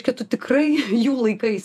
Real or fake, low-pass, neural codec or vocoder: fake; 14.4 kHz; vocoder, 48 kHz, 128 mel bands, Vocos